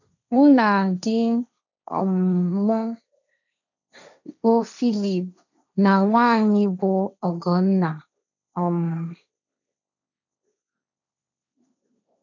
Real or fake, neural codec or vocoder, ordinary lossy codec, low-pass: fake; codec, 16 kHz, 1.1 kbps, Voila-Tokenizer; none; 7.2 kHz